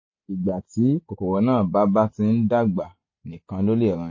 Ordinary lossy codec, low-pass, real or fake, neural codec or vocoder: MP3, 32 kbps; 7.2 kHz; real; none